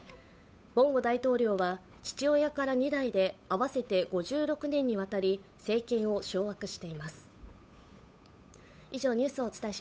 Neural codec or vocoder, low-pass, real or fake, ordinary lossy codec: codec, 16 kHz, 8 kbps, FunCodec, trained on Chinese and English, 25 frames a second; none; fake; none